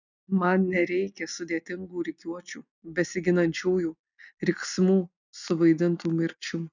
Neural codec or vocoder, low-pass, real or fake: none; 7.2 kHz; real